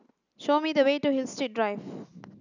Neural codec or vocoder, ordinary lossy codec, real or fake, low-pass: none; none; real; 7.2 kHz